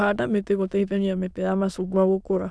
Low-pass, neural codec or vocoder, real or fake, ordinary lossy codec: none; autoencoder, 22.05 kHz, a latent of 192 numbers a frame, VITS, trained on many speakers; fake; none